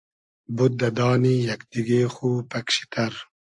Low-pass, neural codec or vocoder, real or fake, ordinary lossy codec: 10.8 kHz; none; real; AAC, 64 kbps